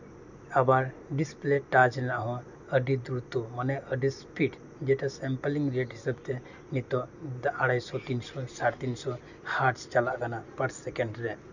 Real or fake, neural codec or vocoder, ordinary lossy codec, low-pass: real; none; none; 7.2 kHz